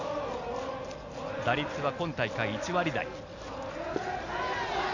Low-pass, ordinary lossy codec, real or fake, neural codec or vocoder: 7.2 kHz; none; real; none